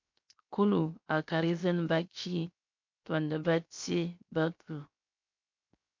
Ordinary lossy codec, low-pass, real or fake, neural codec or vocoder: MP3, 48 kbps; 7.2 kHz; fake; codec, 16 kHz, 0.7 kbps, FocalCodec